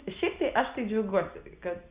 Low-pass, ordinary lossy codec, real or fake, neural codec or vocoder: 3.6 kHz; Opus, 64 kbps; real; none